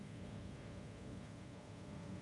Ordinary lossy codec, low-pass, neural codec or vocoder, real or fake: MP3, 48 kbps; 10.8 kHz; codec, 24 kHz, 0.5 kbps, DualCodec; fake